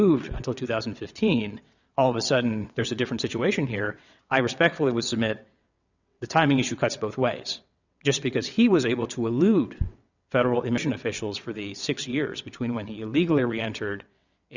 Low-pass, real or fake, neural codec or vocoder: 7.2 kHz; fake; vocoder, 22.05 kHz, 80 mel bands, WaveNeXt